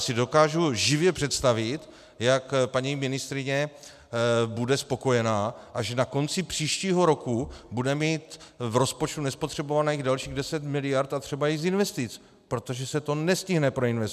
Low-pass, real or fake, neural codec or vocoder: 14.4 kHz; real; none